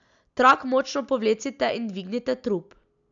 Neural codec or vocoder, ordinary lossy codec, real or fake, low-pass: none; MP3, 96 kbps; real; 7.2 kHz